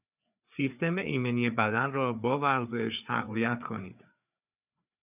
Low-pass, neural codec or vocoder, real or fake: 3.6 kHz; codec, 16 kHz, 4 kbps, FreqCodec, larger model; fake